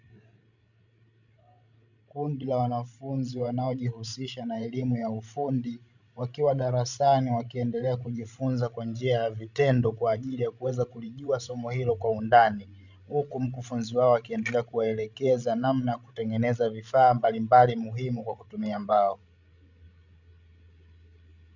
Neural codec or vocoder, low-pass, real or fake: codec, 16 kHz, 16 kbps, FreqCodec, larger model; 7.2 kHz; fake